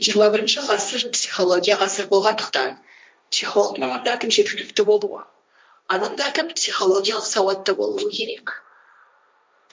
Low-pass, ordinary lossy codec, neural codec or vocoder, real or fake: none; none; codec, 16 kHz, 1.1 kbps, Voila-Tokenizer; fake